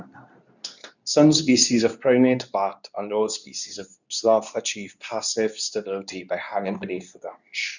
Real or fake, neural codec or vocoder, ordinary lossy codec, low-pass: fake; codec, 24 kHz, 0.9 kbps, WavTokenizer, medium speech release version 2; none; 7.2 kHz